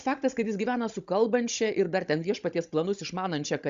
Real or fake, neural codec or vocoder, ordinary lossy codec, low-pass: fake; codec, 16 kHz, 16 kbps, FunCodec, trained on Chinese and English, 50 frames a second; Opus, 64 kbps; 7.2 kHz